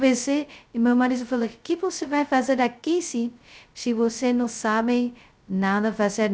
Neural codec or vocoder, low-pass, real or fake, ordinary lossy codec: codec, 16 kHz, 0.2 kbps, FocalCodec; none; fake; none